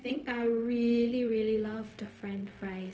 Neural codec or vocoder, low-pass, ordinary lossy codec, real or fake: codec, 16 kHz, 0.4 kbps, LongCat-Audio-Codec; none; none; fake